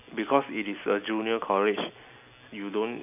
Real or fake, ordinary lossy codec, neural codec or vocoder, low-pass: real; none; none; 3.6 kHz